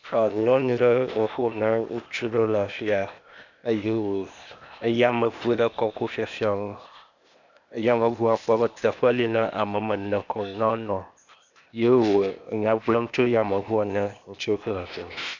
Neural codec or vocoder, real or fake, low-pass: codec, 16 kHz, 0.8 kbps, ZipCodec; fake; 7.2 kHz